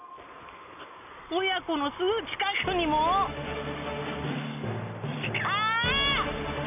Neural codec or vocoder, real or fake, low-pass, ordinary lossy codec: none; real; 3.6 kHz; none